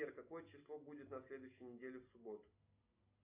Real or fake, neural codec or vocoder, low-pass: real; none; 3.6 kHz